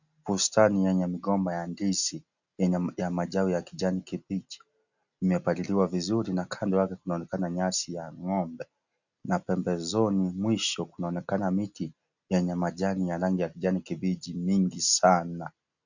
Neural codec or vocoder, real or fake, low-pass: none; real; 7.2 kHz